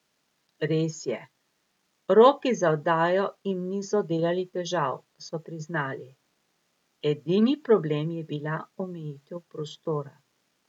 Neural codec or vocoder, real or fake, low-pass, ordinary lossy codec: none; real; 19.8 kHz; none